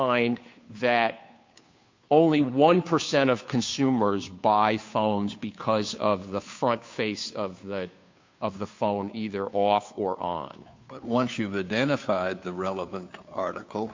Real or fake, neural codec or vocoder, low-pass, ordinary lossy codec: fake; codec, 16 kHz, 2 kbps, FunCodec, trained on Chinese and English, 25 frames a second; 7.2 kHz; MP3, 48 kbps